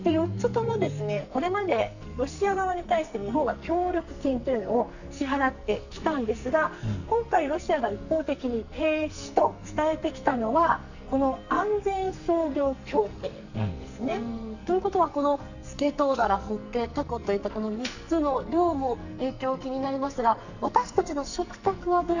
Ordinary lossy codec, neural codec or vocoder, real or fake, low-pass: none; codec, 44.1 kHz, 2.6 kbps, SNAC; fake; 7.2 kHz